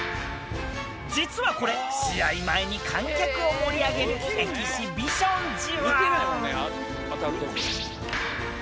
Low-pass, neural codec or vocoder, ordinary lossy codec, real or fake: none; none; none; real